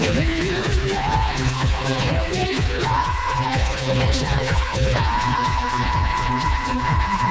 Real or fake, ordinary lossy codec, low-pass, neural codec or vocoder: fake; none; none; codec, 16 kHz, 2 kbps, FreqCodec, smaller model